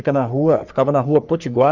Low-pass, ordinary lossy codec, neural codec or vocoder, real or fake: 7.2 kHz; none; codec, 44.1 kHz, 3.4 kbps, Pupu-Codec; fake